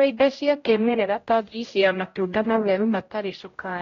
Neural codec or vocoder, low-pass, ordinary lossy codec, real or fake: codec, 16 kHz, 0.5 kbps, X-Codec, HuBERT features, trained on general audio; 7.2 kHz; AAC, 32 kbps; fake